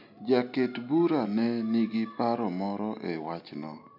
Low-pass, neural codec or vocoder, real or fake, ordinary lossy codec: 5.4 kHz; none; real; none